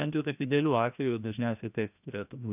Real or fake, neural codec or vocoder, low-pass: fake; codec, 16 kHz, 1 kbps, FreqCodec, larger model; 3.6 kHz